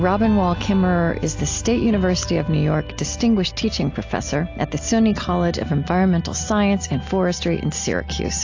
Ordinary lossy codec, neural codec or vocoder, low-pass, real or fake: AAC, 48 kbps; none; 7.2 kHz; real